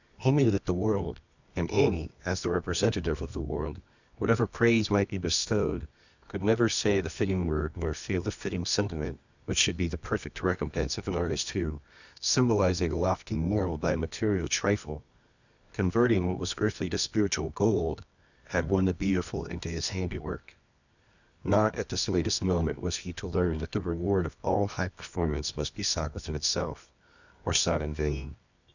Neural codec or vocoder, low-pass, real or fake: codec, 24 kHz, 0.9 kbps, WavTokenizer, medium music audio release; 7.2 kHz; fake